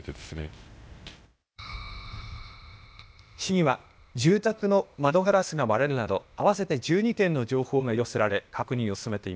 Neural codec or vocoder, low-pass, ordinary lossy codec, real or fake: codec, 16 kHz, 0.8 kbps, ZipCodec; none; none; fake